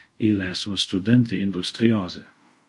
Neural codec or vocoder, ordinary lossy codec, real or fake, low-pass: codec, 24 kHz, 0.5 kbps, DualCodec; MP3, 48 kbps; fake; 10.8 kHz